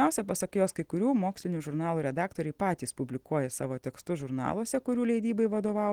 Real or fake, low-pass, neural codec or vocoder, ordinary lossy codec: real; 19.8 kHz; none; Opus, 16 kbps